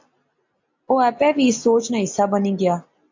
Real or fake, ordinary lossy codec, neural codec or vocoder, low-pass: real; MP3, 48 kbps; none; 7.2 kHz